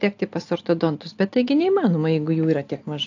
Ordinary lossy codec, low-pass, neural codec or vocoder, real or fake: AAC, 48 kbps; 7.2 kHz; none; real